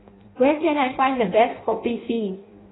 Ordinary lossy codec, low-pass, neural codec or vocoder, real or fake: AAC, 16 kbps; 7.2 kHz; codec, 16 kHz in and 24 kHz out, 0.6 kbps, FireRedTTS-2 codec; fake